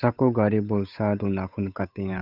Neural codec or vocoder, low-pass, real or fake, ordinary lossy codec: codec, 16 kHz, 8 kbps, FunCodec, trained on Chinese and English, 25 frames a second; 5.4 kHz; fake; none